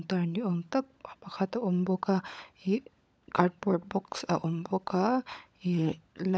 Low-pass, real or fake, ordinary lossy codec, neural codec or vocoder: none; fake; none; codec, 16 kHz, 16 kbps, FunCodec, trained on LibriTTS, 50 frames a second